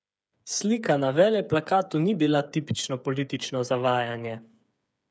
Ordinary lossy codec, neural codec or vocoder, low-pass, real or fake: none; codec, 16 kHz, 8 kbps, FreqCodec, smaller model; none; fake